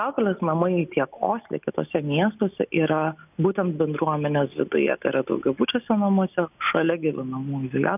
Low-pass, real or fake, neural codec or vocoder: 3.6 kHz; real; none